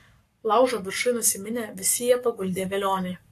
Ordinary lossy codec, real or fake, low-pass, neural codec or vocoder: AAC, 64 kbps; fake; 14.4 kHz; codec, 44.1 kHz, 7.8 kbps, Pupu-Codec